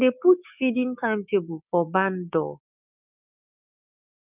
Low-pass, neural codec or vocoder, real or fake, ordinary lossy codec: 3.6 kHz; codec, 16 kHz, 6 kbps, DAC; fake; none